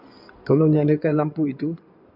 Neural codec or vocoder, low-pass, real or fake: codec, 16 kHz in and 24 kHz out, 2.2 kbps, FireRedTTS-2 codec; 5.4 kHz; fake